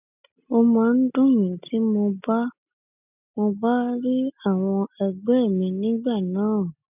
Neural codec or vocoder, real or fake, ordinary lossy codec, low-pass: none; real; none; 3.6 kHz